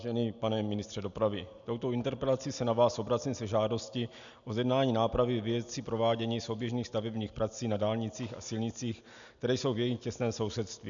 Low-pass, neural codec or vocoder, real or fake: 7.2 kHz; none; real